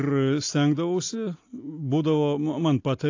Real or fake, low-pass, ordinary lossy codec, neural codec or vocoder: real; 7.2 kHz; AAC, 48 kbps; none